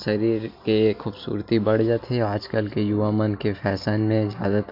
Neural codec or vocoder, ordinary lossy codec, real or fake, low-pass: none; MP3, 48 kbps; real; 5.4 kHz